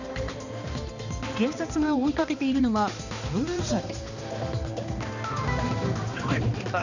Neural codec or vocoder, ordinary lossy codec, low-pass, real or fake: codec, 16 kHz, 2 kbps, X-Codec, HuBERT features, trained on balanced general audio; none; 7.2 kHz; fake